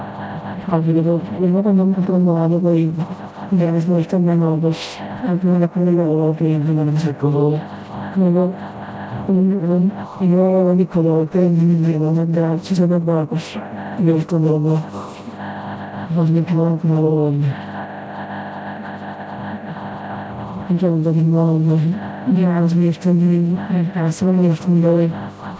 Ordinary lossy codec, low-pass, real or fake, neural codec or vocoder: none; none; fake; codec, 16 kHz, 0.5 kbps, FreqCodec, smaller model